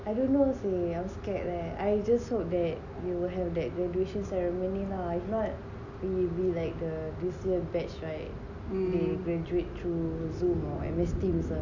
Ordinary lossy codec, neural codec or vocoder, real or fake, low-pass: none; none; real; 7.2 kHz